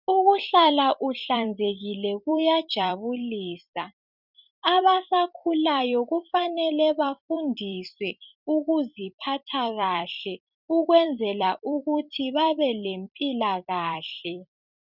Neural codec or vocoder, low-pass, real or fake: vocoder, 44.1 kHz, 128 mel bands every 256 samples, BigVGAN v2; 5.4 kHz; fake